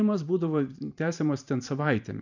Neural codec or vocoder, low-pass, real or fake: vocoder, 44.1 kHz, 128 mel bands every 512 samples, BigVGAN v2; 7.2 kHz; fake